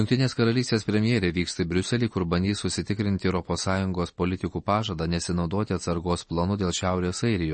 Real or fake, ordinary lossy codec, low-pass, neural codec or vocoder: real; MP3, 32 kbps; 10.8 kHz; none